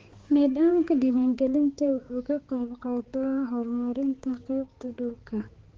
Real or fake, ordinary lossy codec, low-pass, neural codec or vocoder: fake; Opus, 16 kbps; 7.2 kHz; codec, 16 kHz, 4 kbps, X-Codec, HuBERT features, trained on balanced general audio